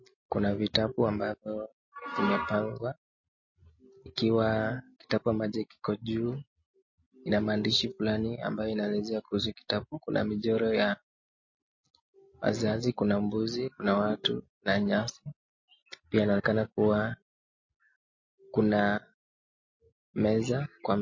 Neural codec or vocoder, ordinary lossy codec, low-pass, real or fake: none; MP3, 32 kbps; 7.2 kHz; real